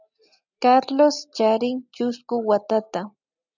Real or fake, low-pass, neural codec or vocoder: real; 7.2 kHz; none